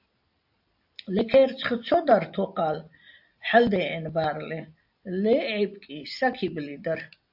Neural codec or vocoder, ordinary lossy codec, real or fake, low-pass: none; AAC, 48 kbps; real; 5.4 kHz